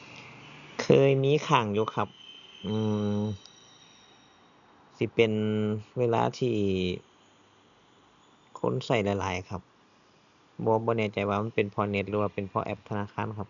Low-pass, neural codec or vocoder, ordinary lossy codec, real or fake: 7.2 kHz; none; none; real